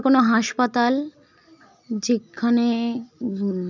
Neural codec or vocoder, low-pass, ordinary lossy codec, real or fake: none; 7.2 kHz; none; real